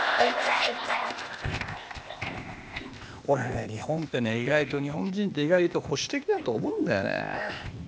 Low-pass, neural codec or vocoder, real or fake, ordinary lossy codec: none; codec, 16 kHz, 0.8 kbps, ZipCodec; fake; none